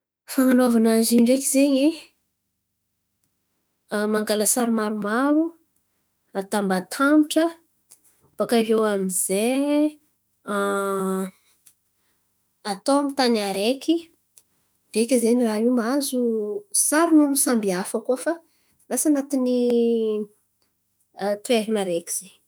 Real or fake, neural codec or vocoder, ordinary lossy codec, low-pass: fake; autoencoder, 48 kHz, 32 numbers a frame, DAC-VAE, trained on Japanese speech; none; none